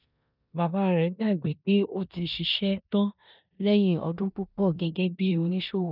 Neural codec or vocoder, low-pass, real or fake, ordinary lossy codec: codec, 16 kHz in and 24 kHz out, 0.9 kbps, LongCat-Audio-Codec, four codebook decoder; 5.4 kHz; fake; none